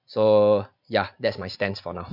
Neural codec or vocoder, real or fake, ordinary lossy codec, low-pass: none; real; none; 5.4 kHz